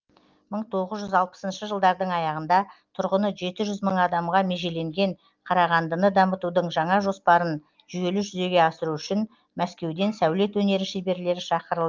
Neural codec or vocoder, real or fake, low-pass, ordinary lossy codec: none; real; 7.2 kHz; Opus, 32 kbps